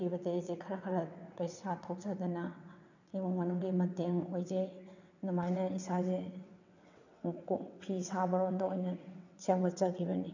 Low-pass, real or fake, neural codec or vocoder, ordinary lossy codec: 7.2 kHz; fake; vocoder, 22.05 kHz, 80 mel bands, WaveNeXt; none